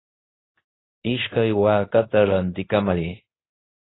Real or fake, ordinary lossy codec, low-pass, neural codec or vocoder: fake; AAC, 16 kbps; 7.2 kHz; codec, 24 kHz, 0.9 kbps, WavTokenizer, medium speech release version 2